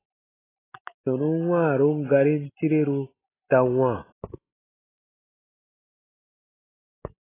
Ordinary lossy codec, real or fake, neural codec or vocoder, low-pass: AAC, 16 kbps; real; none; 3.6 kHz